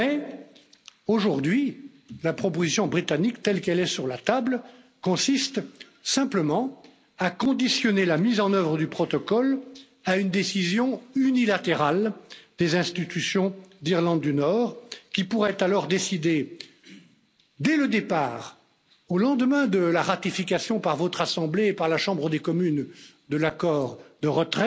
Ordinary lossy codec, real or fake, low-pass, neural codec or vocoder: none; real; none; none